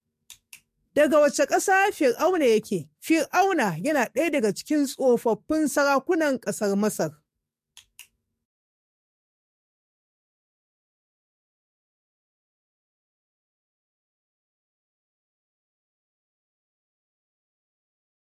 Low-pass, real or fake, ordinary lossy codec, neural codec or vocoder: 14.4 kHz; fake; MP3, 64 kbps; codec, 44.1 kHz, 7.8 kbps, DAC